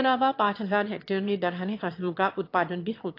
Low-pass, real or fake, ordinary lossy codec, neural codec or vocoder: 5.4 kHz; fake; AAC, 32 kbps; autoencoder, 22.05 kHz, a latent of 192 numbers a frame, VITS, trained on one speaker